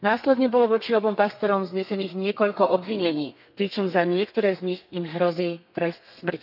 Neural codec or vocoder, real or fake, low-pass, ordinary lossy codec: codec, 32 kHz, 1.9 kbps, SNAC; fake; 5.4 kHz; none